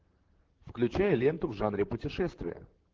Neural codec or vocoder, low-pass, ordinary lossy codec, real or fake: vocoder, 44.1 kHz, 128 mel bands, Pupu-Vocoder; 7.2 kHz; Opus, 16 kbps; fake